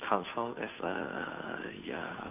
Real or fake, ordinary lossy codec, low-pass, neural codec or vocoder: fake; none; 3.6 kHz; codec, 16 kHz, 2 kbps, FunCodec, trained on Chinese and English, 25 frames a second